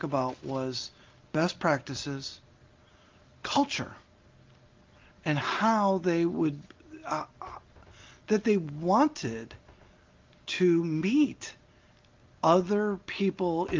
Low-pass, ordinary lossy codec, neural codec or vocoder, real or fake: 7.2 kHz; Opus, 32 kbps; none; real